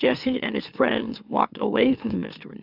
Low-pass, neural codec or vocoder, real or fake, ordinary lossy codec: 5.4 kHz; autoencoder, 44.1 kHz, a latent of 192 numbers a frame, MeloTTS; fake; AAC, 48 kbps